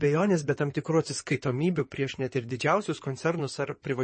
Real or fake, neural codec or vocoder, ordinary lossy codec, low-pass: fake; codec, 16 kHz in and 24 kHz out, 2.2 kbps, FireRedTTS-2 codec; MP3, 32 kbps; 9.9 kHz